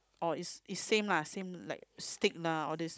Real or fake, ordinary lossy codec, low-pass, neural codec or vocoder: fake; none; none; codec, 16 kHz, 16 kbps, FunCodec, trained on Chinese and English, 50 frames a second